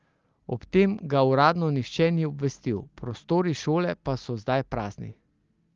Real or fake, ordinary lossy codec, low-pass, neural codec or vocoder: real; Opus, 32 kbps; 7.2 kHz; none